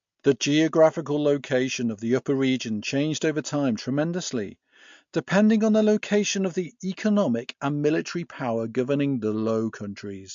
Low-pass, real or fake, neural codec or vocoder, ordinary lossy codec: 7.2 kHz; real; none; MP3, 48 kbps